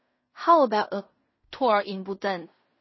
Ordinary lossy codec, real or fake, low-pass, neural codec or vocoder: MP3, 24 kbps; fake; 7.2 kHz; codec, 16 kHz in and 24 kHz out, 0.4 kbps, LongCat-Audio-Codec, fine tuned four codebook decoder